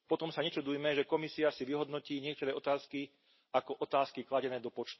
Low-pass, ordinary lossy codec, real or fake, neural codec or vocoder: 7.2 kHz; MP3, 24 kbps; real; none